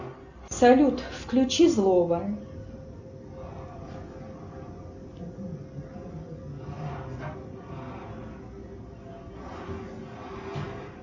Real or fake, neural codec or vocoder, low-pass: real; none; 7.2 kHz